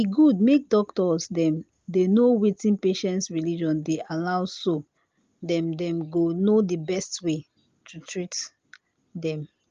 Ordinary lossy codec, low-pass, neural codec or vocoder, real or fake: Opus, 32 kbps; 7.2 kHz; none; real